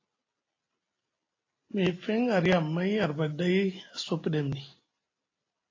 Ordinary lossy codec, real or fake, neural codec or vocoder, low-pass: AAC, 32 kbps; real; none; 7.2 kHz